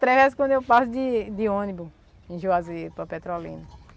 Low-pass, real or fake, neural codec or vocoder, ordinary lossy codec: none; real; none; none